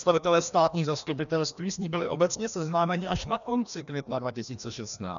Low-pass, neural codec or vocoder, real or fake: 7.2 kHz; codec, 16 kHz, 1 kbps, FreqCodec, larger model; fake